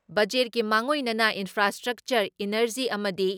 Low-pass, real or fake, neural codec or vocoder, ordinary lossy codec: none; real; none; none